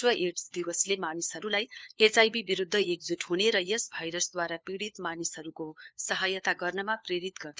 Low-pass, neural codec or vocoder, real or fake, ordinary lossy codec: none; codec, 16 kHz, 4 kbps, FunCodec, trained on LibriTTS, 50 frames a second; fake; none